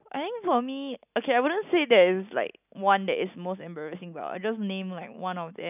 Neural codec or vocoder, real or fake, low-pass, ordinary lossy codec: none; real; 3.6 kHz; none